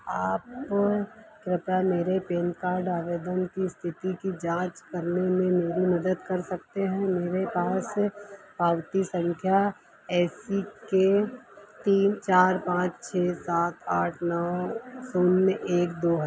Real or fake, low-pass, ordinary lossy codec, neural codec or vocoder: real; none; none; none